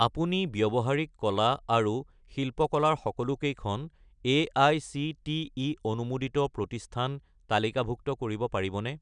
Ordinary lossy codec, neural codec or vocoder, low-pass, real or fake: none; none; 9.9 kHz; real